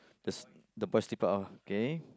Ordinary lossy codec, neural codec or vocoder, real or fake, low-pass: none; none; real; none